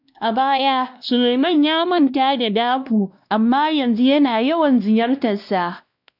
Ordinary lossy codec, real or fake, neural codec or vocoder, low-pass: none; fake; codec, 16 kHz, 1 kbps, X-Codec, WavLM features, trained on Multilingual LibriSpeech; 5.4 kHz